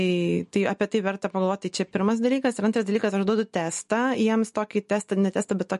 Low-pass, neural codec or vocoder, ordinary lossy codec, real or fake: 14.4 kHz; none; MP3, 48 kbps; real